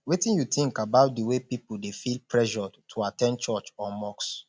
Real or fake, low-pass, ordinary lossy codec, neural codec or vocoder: real; none; none; none